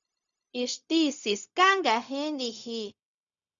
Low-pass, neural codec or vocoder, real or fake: 7.2 kHz; codec, 16 kHz, 0.4 kbps, LongCat-Audio-Codec; fake